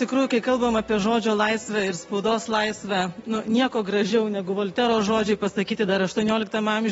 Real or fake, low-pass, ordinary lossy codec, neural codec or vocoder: real; 19.8 kHz; AAC, 24 kbps; none